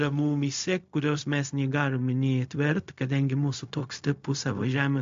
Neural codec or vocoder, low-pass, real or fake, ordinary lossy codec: codec, 16 kHz, 0.4 kbps, LongCat-Audio-Codec; 7.2 kHz; fake; MP3, 48 kbps